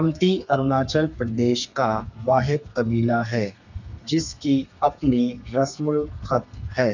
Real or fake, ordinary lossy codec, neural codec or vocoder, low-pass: fake; none; codec, 32 kHz, 1.9 kbps, SNAC; 7.2 kHz